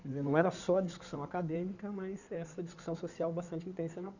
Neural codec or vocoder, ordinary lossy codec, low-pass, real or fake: codec, 16 kHz in and 24 kHz out, 2.2 kbps, FireRedTTS-2 codec; none; 7.2 kHz; fake